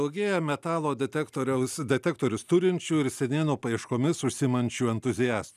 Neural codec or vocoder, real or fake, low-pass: none; real; 14.4 kHz